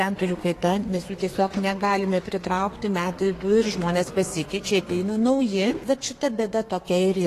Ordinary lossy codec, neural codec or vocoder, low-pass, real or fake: AAC, 48 kbps; codec, 32 kHz, 1.9 kbps, SNAC; 14.4 kHz; fake